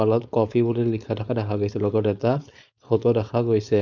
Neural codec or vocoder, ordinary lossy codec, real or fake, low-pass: codec, 16 kHz, 4.8 kbps, FACodec; none; fake; 7.2 kHz